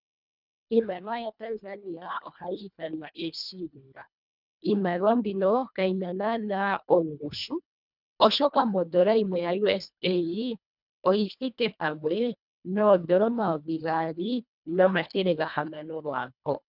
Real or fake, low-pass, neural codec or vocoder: fake; 5.4 kHz; codec, 24 kHz, 1.5 kbps, HILCodec